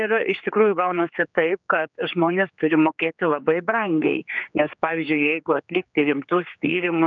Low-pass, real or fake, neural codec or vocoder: 7.2 kHz; fake; codec, 16 kHz, 4 kbps, X-Codec, HuBERT features, trained on general audio